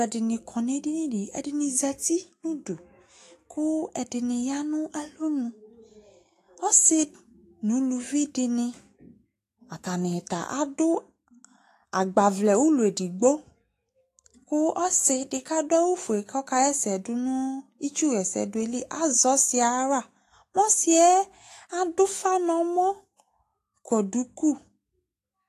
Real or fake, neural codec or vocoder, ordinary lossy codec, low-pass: fake; autoencoder, 48 kHz, 128 numbers a frame, DAC-VAE, trained on Japanese speech; AAC, 48 kbps; 14.4 kHz